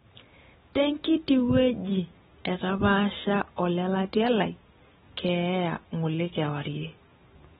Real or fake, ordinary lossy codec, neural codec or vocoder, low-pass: real; AAC, 16 kbps; none; 19.8 kHz